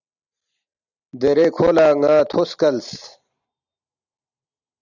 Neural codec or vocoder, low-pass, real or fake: none; 7.2 kHz; real